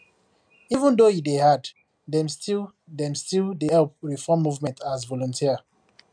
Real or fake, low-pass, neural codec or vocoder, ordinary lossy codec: real; 9.9 kHz; none; MP3, 96 kbps